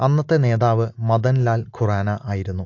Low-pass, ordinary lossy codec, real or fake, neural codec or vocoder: 7.2 kHz; AAC, 48 kbps; real; none